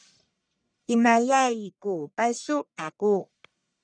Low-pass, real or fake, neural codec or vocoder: 9.9 kHz; fake; codec, 44.1 kHz, 1.7 kbps, Pupu-Codec